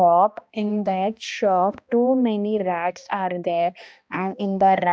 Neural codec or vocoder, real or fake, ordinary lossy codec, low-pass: codec, 16 kHz, 1 kbps, X-Codec, HuBERT features, trained on balanced general audio; fake; none; none